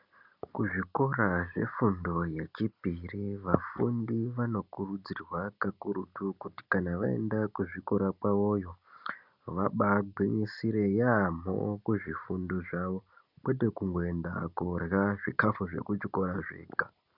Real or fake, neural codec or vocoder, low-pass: real; none; 5.4 kHz